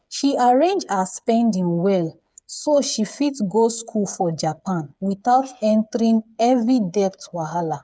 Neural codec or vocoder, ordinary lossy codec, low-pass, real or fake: codec, 16 kHz, 8 kbps, FreqCodec, smaller model; none; none; fake